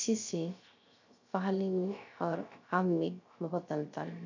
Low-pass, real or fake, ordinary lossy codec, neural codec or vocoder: 7.2 kHz; fake; MP3, 64 kbps; codec, 16 kHz, 0.3 kbps, FocalCodec